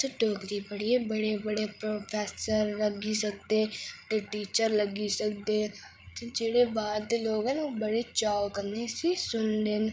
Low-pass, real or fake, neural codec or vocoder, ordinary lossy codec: none; fake; codec, 16 kHz, 8 kbps, FreqCodec, larger model; none